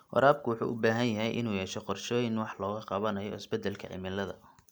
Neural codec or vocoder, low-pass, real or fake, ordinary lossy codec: none; none; real; none